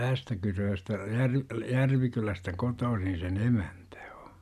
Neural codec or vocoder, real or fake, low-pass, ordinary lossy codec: none; real; 14.4 kHz; none